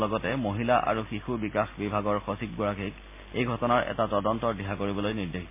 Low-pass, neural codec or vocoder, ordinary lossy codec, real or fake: 3.6 kHz; none; MP3, 24 kbps; real